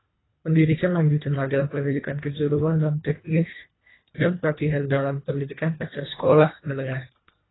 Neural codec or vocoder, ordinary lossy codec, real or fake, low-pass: codec, 24 kHz, 1.5 kbps, HILCodec; AAC, 16 kbps; fake; 7.2 kHz